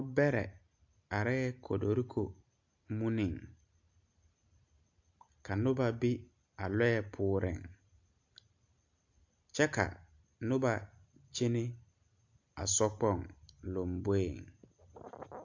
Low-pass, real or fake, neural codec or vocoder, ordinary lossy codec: 7.2 kHz; real; none; AAC, 48 kbps